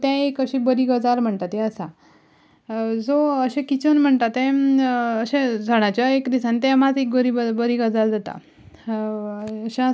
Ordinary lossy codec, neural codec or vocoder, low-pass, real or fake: none; none; none; real